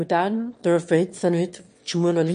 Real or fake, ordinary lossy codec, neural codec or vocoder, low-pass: fake; MP3, 48 kbps; autoencoder, 22.05 kHz, a latent of 192 numbers a frame, VITS, trained on one speaker; 9.9 kHz